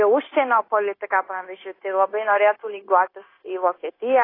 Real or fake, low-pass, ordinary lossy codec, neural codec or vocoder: fake; 5.4 kHz; AAC, 24 kbps; codec, 16 kHz, 0.9 kbps, LongCat-Audio-Codec